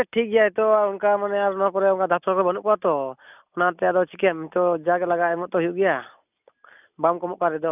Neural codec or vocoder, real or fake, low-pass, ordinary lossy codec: none; real; 3.6 kHz; none